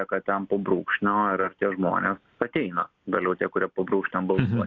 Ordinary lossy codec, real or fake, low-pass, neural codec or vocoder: AAC, 48 kbps; real; 7.2 kHz; none